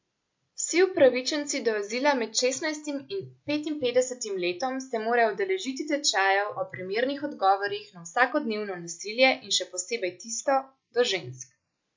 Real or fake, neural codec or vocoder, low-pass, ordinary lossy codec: real; none; 7.2 kHz; MP3, 48 kbps